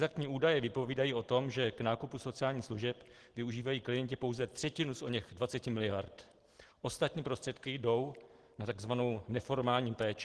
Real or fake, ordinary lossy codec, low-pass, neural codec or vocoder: real; Opus, 16 kbps; 10.8 kHz; none